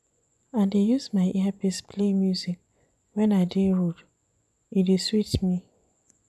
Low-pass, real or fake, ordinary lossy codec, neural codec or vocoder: none; fake; none; vocoder, 24 kHz, 100 mel bands, Vocos